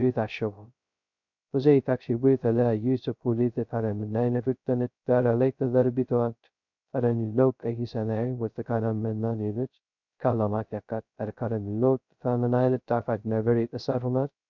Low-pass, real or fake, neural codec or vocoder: 7.2 kHz; fake; codec, 16 kHz, 0.2 kbps, FocalCodec